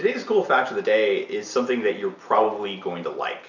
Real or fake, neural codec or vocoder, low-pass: real; none; 7.2 kHz